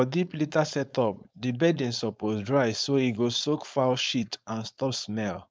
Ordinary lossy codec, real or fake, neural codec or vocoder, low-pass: none; fake; codec, 16 kHz, 4.8 kbps, FACodec; none